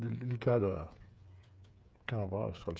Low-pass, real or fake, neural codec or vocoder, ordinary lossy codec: none; fake; codec, 16 kHz, 8 kbps, FreqCodec, smaller model; none